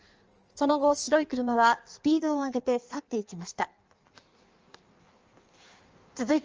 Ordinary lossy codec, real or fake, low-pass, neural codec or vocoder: Opus, 24 kbps; fake; 7.2 kHz; codec, 16 kHz in and 24 kHz out, 1.1 kbps, FireRedTTS-2 codec